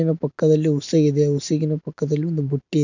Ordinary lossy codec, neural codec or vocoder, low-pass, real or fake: none; none; 7.2 kHz; real